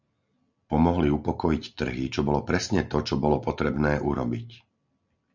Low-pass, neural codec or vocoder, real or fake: 7.2 kHz; none; real